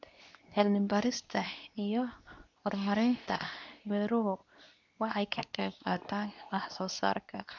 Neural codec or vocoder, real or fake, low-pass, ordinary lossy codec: codec, 24 kHz, 0.9 kbps, WavTokenizer, medium speech release version 2; fake; 7.2 kHz; none